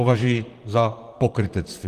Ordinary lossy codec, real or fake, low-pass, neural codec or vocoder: Opus, 24 kbps; fake; 14.4 kHz; vocoder, 44.1 kHz, 128 mel bands, Pupu-Vocoder